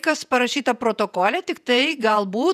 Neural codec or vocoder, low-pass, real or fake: vocoder, 48 kHz, 128 mel bands, Vocos; 14.4 kHz; fake